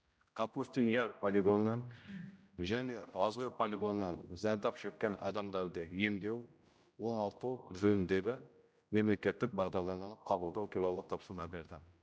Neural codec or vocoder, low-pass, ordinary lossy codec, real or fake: codec, 16 kHz, 0.5 kbps, X-Codec, HuBERT features, trained on general audio; none; none; fake